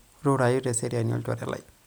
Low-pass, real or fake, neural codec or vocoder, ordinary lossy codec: none; real; none; none